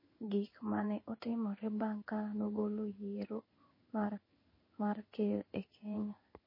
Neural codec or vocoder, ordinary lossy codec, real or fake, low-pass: codec, 16 kHz in and 24 kHz out, 1 kbps, XY-Tokenizer; MP3, 24 kbps; fake; 5.4 kHz